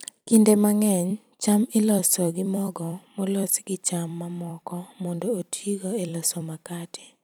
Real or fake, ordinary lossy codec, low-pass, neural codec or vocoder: fake; none; none; vocoder, 44.1 kHz, 128 mel bands every 256 samples, BigVGAN v2